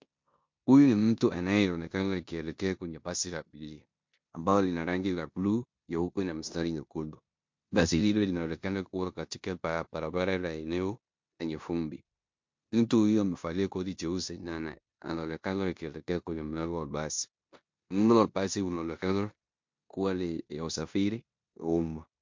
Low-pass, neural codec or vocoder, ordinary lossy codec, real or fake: 7.2 kHz; codec, 16 kHz in and 24 kHz out, 0.9 kbps, LongCat-Audio-Codec, four codebook decoder; MP3, 48 kbps; fake